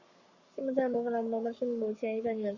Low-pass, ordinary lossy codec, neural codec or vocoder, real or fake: 7.2 kHz; Opus, 64 kbps; codec, 44.1 kHz, 7.8 kbps, Pupu-Codec; fake